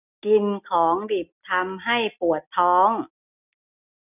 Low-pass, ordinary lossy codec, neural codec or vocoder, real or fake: 3.6 kHz; none; none; real